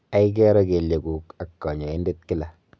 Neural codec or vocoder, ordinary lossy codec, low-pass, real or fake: none; none; none; real